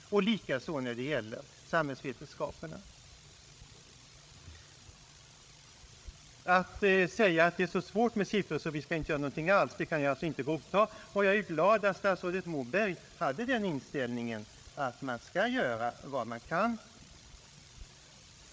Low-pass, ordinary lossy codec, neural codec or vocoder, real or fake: none; none; codec, 16 kHz, 8 kbps, FreqCodec, larger model; fake